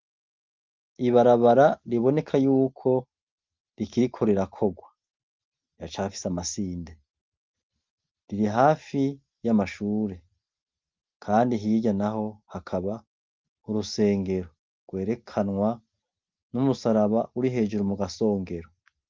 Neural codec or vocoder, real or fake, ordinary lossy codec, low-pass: none; real; Opus, 32 kbps; 7.2 kHz